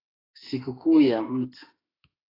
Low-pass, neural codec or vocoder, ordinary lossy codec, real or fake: 5.4 kHz; autoencoder, 48 kHz, 32 numbers a frame, DAC-VAE, trained on Japanese speech; AAC, 24 kbps; fake